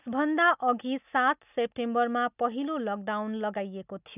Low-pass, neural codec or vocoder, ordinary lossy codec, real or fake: 3.6 kHz; none; none; real